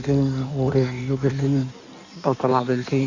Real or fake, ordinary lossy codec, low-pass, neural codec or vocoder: fake; Opus, 64 kbps; 7.2 kHz; codec, 16 kHz in and 24 kHz out, 1.1 kbps, FireRedTTS-2 codec